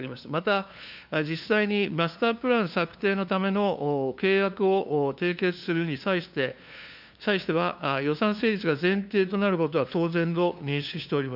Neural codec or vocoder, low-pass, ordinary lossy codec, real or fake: codec, 16 kHz, 2 kbps, FunCodec, trained on LibriTTS, 25 frames a second; 5.4 kHz; none; fake